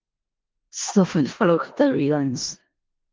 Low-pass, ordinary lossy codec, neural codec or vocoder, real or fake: 7.2 kHz; Opus, 32 kbps; codec, 16 kHz in and 24 kHz out, 0.4 kbps, LongCat-Audio-Codec, four codebook decoder; fake